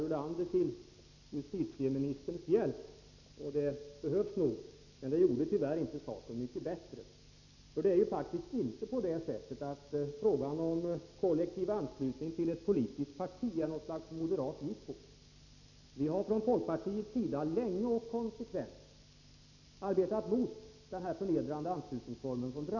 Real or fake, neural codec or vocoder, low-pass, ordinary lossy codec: real; none; 7.2 kHz; none